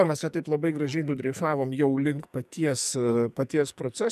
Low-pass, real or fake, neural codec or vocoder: 14.4 kHz; fake; codec, 44.1 kHz, 2.6 kbps, SNAC